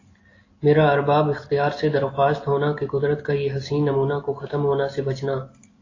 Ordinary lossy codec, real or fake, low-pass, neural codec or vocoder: AAC, 32 kbps; real; 7.2 kHz; none